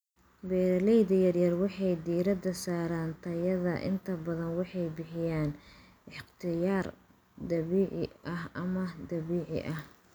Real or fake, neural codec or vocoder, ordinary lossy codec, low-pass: real; none; none; none